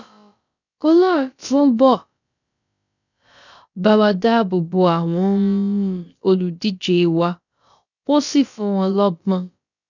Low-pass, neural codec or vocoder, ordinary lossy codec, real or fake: 7.2 kHz; codec, 16 kHz, about 1 kbps, DyCAST, with the encoder's durations; none; fake